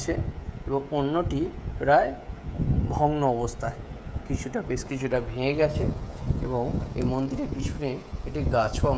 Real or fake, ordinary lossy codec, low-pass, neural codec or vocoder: fake; none; none; codec, 16 kHz, 16 kbps, FreqCodec, smaller model